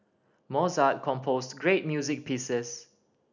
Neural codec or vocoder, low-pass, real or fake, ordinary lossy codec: none; 7.2 kHz; real; none